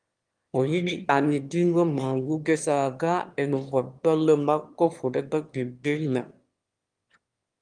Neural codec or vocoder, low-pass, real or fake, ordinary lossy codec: autoencoder, 22.05 kHz, a latent of 192 numbers a frame, VITS, trained on one speaker; 9.9 kHz; fake; Opus, 32 kbps